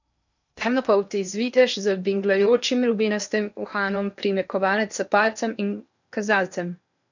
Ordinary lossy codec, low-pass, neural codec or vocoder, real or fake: none; 7.2 kHz; codec, 16 kHz in and 24 kHz out, 0.8 kbps, FocalCodec, streaming, 65536 codes; fake